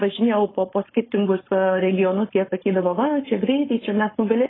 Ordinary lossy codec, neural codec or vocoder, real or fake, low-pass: AAC, 16 kbps; codec, 16 kHz, 4.8 kbps, FACodec; fake; 7.2 kHz